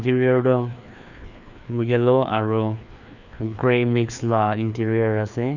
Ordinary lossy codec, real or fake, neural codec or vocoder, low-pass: none; fake; codec, 16 kHz, 2 kbps, FreqCodec, larger model; 7.2 kHz